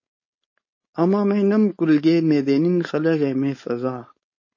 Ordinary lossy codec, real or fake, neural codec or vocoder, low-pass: MP3, 32 kbps; fake; codec, 16 kHz, 4.8 kbps, FACodec; 7.2 kHz